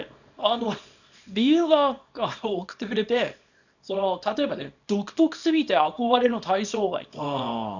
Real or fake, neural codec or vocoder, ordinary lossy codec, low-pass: fake; codec, 24 kHz, 0.9 kbps, WavTokenizer, small release; none; 7.2 kHz